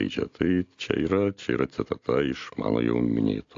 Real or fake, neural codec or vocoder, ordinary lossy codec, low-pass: real; none; AAC, 48 kbps; 7.2 kHz